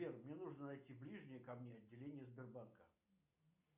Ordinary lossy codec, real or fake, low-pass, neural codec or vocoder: MP3, 32 kbps; real; 3.6 kHz; none